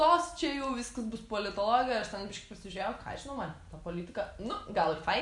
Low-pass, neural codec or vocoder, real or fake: 10.8 kHz; none; real